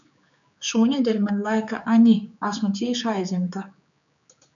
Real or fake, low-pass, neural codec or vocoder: fake; 7.2 kHz; codec, 16 kHz, 4 kbps, X-Codec, HuBERT features, trained on general audio